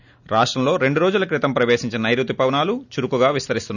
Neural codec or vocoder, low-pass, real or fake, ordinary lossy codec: none; 7.2 kHz; real; none